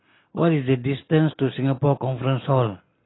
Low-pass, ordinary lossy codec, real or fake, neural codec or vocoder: 7.2 kHz; AAC, 16 kbps; real; none